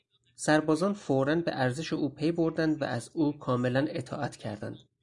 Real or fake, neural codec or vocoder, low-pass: real; none; 10.8 kHz